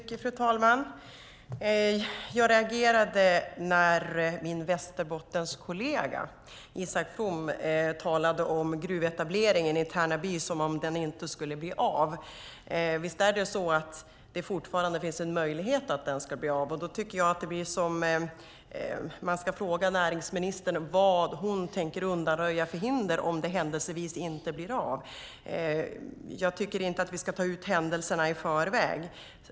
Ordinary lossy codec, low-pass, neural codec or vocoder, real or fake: none; none; none; real